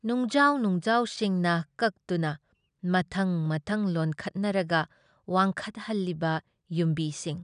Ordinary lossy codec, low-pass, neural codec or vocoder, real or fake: none; 9.9 kHz; none; real